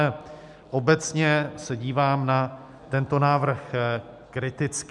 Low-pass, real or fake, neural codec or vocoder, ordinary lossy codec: 10.8 kHz; real; none; MP3, 96 kbps